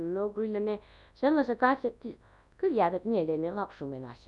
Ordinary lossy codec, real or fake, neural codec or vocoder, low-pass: none; fake; codec, 24 kHz, 0.9 kbps, WavTokenizer, large speech release; 10.8 kHz